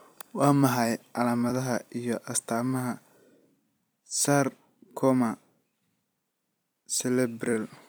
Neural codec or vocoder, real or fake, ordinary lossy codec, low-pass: vocoder, 44.1 kHz, 128 mel bands every 256 samples, BigVGAN v2; fake; none; none